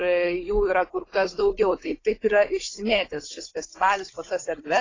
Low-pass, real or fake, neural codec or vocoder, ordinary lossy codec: 7.2 kHz; fake; codec, 16 kHz, 16 kbps, FunCodec, trained on Chinese and English, 50 frames a second; AAC, 32 kbps